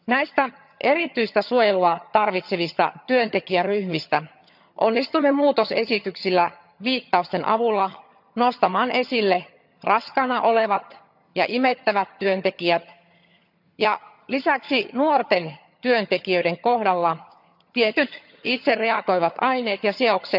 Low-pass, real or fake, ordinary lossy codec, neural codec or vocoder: 5.4 kHz; fake; none; vocoder, 22.05 kHz, 80 mel bands, HiFi-GAN